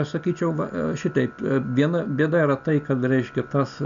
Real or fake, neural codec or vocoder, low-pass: real; none; 7.2 kHz